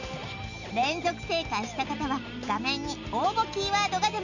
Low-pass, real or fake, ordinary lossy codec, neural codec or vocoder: 7.2 kHz; real; none; none